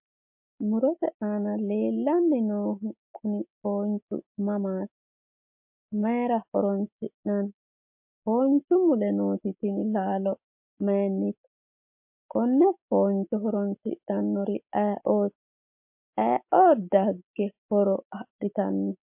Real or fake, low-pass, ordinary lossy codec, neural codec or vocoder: real; 3.6 kHz; MP3, 32 kbps; none